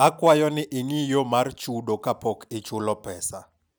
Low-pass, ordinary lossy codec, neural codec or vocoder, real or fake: none; none; none; real